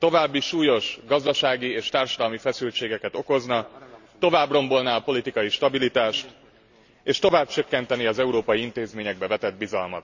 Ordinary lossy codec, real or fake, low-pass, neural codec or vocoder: none; real; 7.2 kHz; none